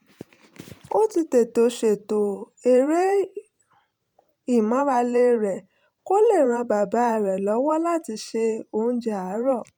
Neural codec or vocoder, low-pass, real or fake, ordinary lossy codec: vocoder, 44.1 kHz, 128 mel bands every 512 samples, BigVGAN v2; 19.8 kHz; fake; none